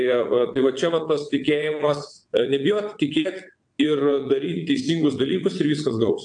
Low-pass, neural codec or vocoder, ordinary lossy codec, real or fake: 9.9 kHz; vocoder, 22.05 kHz, 80 mel bands, WaveNeXt; AAC, 64 kbps; fake